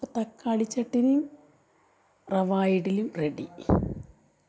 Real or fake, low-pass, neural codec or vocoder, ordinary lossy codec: real; none; none; none